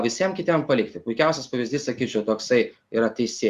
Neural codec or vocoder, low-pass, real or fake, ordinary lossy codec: none; 14.4 kHz; real; Opus, 64 kbps